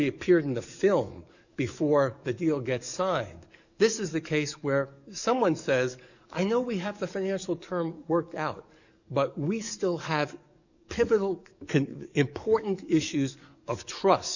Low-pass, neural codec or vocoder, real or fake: 7.2 kHz; codec, 44.1 kHz, 7.8 kbps, DAC; fake